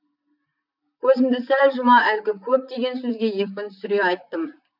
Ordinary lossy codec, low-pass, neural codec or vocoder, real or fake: none; 5.4 kHz; vocoder, 44.1 kHz, 128 mel bands, Pupu-Vocoder; fake